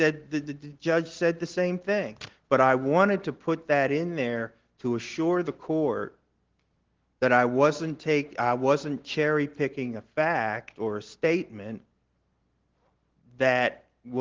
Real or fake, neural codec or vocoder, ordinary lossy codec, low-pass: real; none; Opus, 16 kbps; 7.2 kHz